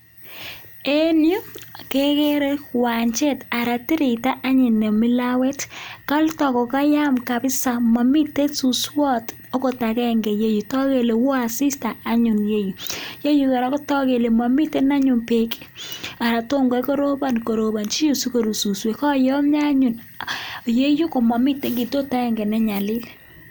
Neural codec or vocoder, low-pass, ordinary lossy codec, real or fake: none; none; none; real